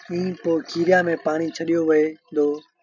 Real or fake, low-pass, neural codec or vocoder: real; 7.2 kHz; none